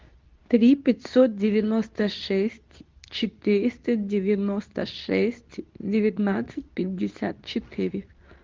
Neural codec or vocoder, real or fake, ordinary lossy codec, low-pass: codec, 24 kHz, 0.9 kbps, WavTokenizer, medium speech release version 2; fake; Opus, 24 kbps; 7.2 kHz